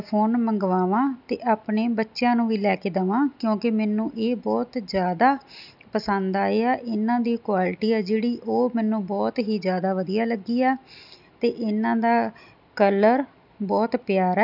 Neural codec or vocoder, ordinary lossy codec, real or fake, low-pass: none; none; real; 5.4 kHz